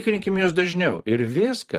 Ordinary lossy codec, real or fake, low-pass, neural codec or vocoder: Opus, 24 kbps; fake; 14.4 kHz; vocoder, 44.1 kHz, 128 mel bands, Pupu-Vocoder